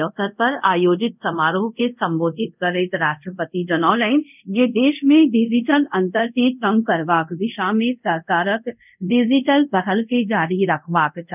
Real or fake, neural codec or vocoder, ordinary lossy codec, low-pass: fake; codec, 24 kHz, 0.5 kbps, DualCodec; none; 3.6 kHz